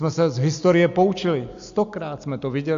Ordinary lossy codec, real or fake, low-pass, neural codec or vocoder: AAC, 64 kbps; real; 7.2 kHz; none